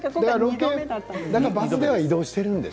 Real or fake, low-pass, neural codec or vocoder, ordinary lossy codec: real; none; none; none